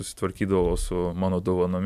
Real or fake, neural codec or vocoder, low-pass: real; none; 14.4 kHz